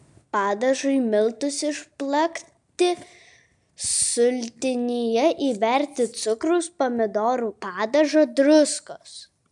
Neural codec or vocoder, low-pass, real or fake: none; 10.8 kHz; real